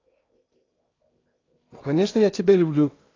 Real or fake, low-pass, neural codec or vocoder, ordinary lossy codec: fake; 7.2 kHz; codec, 16 kHz in and 24 kHz out, 0.8 kbps, FocalCodec, streaming, 65536 codes; AAC, 32 kbps